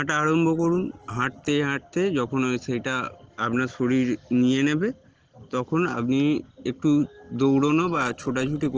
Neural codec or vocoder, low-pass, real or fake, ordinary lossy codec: none; 7.2 kHz; real; Opus, 16 kbps